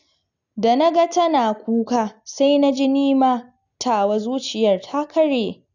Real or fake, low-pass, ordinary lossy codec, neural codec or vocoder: real; 7.2 kHz; none; none